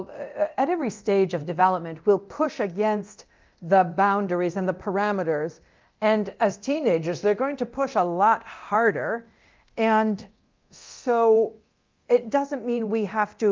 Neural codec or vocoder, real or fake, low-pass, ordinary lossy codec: codec, 24 kHz, 0.9 kbps, DualCodec; fake; 7.2 kHz; Opus, 32 kbps